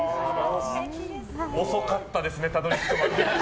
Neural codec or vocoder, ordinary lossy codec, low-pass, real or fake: none; none; none; real